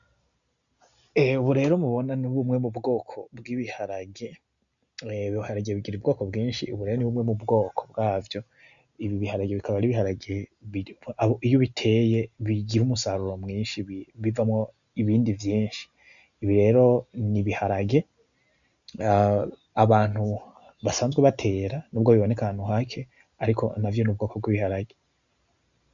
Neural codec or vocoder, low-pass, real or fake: none; 7.2 kHz; real